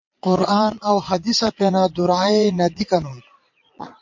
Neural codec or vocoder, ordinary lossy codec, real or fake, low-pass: vocoder, 22.05 kHz, 80 mel bands, Vocos; MP3, 48 kbps; fake; 7.2 kHz